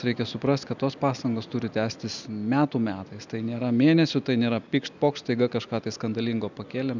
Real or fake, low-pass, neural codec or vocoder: real; 7.2 kHz; none